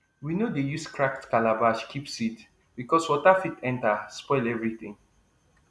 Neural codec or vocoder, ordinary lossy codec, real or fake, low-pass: none; none; real; none